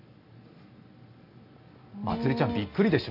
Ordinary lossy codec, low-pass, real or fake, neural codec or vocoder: AAC, 32 kbps; 5.4 kHz; real; none